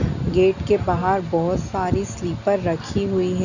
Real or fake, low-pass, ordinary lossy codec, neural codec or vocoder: real; 7.2 kHz; none; none